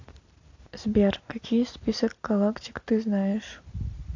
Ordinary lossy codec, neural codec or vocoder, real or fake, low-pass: AAC, 48 kbps; none; real; 7.2 kHz